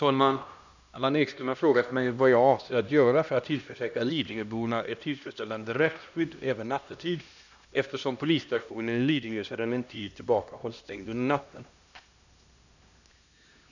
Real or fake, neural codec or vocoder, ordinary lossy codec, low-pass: fake; codec, 16 kHz, 1 kbps, X-Codec, HuBERT features, trained on LibriSpeech; none; 7.2 kHz